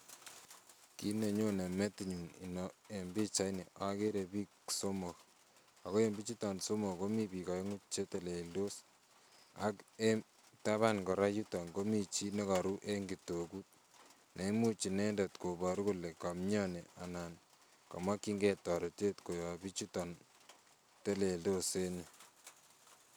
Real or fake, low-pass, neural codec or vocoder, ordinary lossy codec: real; none; none; none